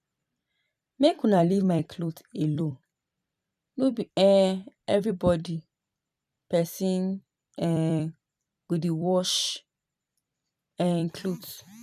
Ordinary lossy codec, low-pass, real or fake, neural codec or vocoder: none; 14.4 kHz; fake; vocoder, 44.1 kHz, 128 mel bands every 256 samples, BigVGAN v2